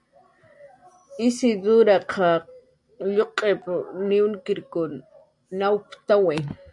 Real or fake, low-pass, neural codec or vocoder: real; 10.8 kHz; none